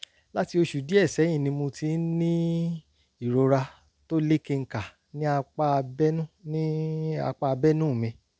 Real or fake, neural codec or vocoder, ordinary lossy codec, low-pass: real; none; none; none